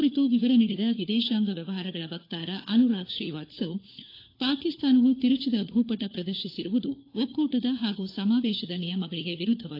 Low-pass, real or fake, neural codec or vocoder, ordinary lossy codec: 5.4 kHz; fake; codec, 16 kHz, 4 kbps, FunCodec, trained on LibriTTS, 50 frames a second; AAC, 32 kbps